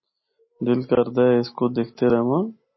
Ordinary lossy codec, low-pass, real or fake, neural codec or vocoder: MP3, 24 kbps; 7.2 kHz; real; none